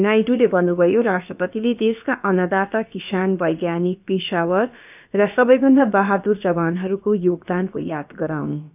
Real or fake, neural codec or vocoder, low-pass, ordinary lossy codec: fake; codec, 16 kHz, about 1 kbps, DyCAST, with the encoder's durations; 3.6 kHz; none